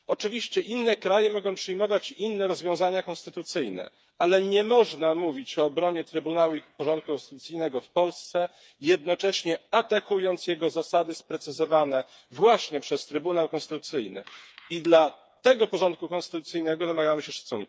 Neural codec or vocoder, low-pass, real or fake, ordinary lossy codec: codec, 16 kHz, 4 kbps, FreqCodec, smaller model; none; fake; none